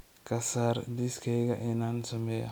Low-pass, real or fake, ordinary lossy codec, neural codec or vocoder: none; real; none; none